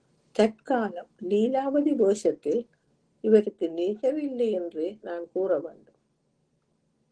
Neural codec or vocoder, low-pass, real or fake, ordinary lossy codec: none; 9.9 kHz; real; Opus, 16 kbps